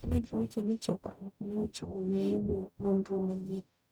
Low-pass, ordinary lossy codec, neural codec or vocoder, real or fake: none; none; codec, 44.1 kHz, 0.9 kbps, DAC; fake